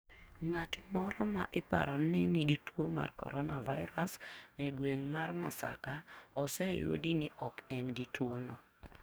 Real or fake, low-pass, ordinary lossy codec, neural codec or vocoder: fake; none; none; codec, 44.1 kHz, 2.6 kbps, DAC